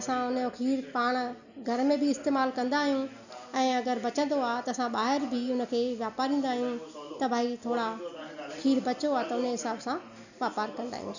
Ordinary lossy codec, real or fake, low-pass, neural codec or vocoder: none; real; 7.2 kHz; none